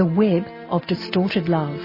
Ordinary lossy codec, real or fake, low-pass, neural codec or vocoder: MP3, 24 kbps; real; 5.4 kHz; none